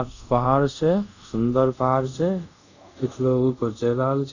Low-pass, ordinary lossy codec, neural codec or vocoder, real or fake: 7.2 kHz; none; codec, 24 kHz, 0.5 kbps, DualCodec; fake